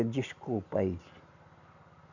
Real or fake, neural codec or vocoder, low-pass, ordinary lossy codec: real; none; 7.2 kHz; none